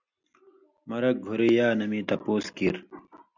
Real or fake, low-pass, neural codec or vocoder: real; 7.2 kHz; none